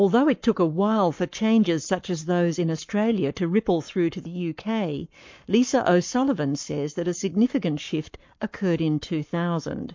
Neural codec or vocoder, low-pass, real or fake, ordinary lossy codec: codec, 44.1 kHz, 7.8 kbps, Pupu-Codec; 7.2 kHz; fake; MP3, 48 kbps